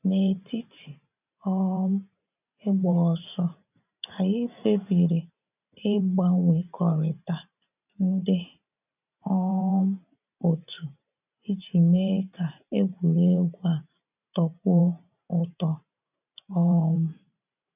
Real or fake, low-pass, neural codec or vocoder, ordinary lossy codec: fake; 3.6 kHz; vocoder, 44.1 kHz, 128 mel bands every 512 samples, BigVGAN v2; none